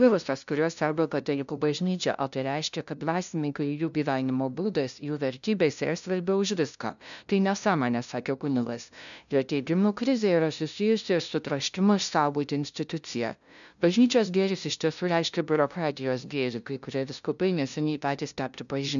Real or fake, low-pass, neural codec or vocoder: fake; 7.2 kHz; codec, 16 kHz, 0.5 kbps, FunCodec, trained on LibriTTS, 25 frames a second